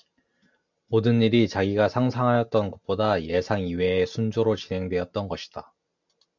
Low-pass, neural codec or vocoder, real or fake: 7.2 kHz; none; real